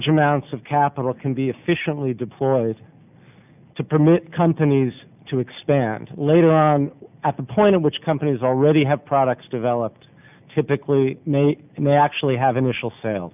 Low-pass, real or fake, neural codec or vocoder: 3.6 kHz; real; none